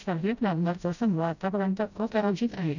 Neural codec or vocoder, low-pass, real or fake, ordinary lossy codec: codec, 16 kHz, 0.5 kbps, FreqCodec, smaller model; 7.2 kHz; fake; none